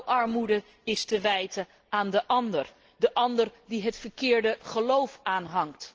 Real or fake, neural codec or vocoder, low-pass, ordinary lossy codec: real; none; 7.2 kHz; Opus, 16 kbps